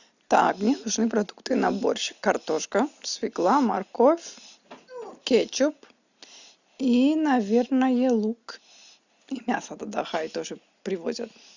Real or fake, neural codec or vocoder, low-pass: real; none; 7.2 kHz